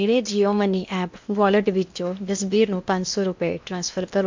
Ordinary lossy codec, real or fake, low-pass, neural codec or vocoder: MP3, 64 kbps; fake; 7.2 kHz; codec, 16 kHz in and 24 kHz out, 0.8 kbps, FocalCodec, streaming, 65536 codes